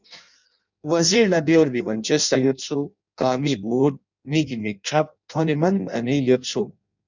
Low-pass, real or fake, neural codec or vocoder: 7.2 kHz; fake; codec, 16 kHz in and 24 kHz out, 0.6 kbps, FireRedTTS-2 codec